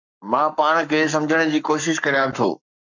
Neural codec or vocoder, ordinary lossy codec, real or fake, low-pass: codec, 44.1 kHz, 7.8 kbps, Pupu-Codec; MP3, 64 kbps; fake; 7.2 kHz